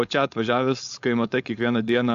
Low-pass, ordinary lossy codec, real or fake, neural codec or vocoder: 7.2 kHz; AAC, 64 kbps; fake; codec, 16 kHz, 4.8 kbps, FACodec